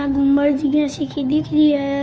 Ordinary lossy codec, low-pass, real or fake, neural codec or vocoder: none; none; fake; codec, 16 kHz, 2 kbps, FunCodec, trained on Chinese and English, 25 frames a second